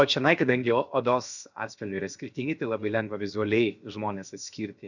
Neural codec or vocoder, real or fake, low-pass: codec, 16 kHz, 0.7 kbps, FocalCodec; fake; 7.2 kHz